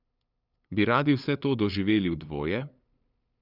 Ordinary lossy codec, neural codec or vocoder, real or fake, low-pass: none; codec, 16 kHz, 8 kbps, FunCodec, trained on LibriTTS, 25 frames a second; fake; 5.4 kHz